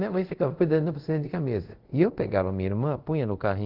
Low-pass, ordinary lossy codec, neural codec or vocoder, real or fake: 5.4 kHz; Opus, 16 kbps; codec, 24 kHz, 0.5 kbps, DualCodec; fake